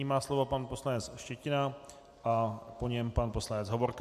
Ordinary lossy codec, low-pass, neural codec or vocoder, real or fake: MP3, 96 kbps; 14.4 kHz; none; real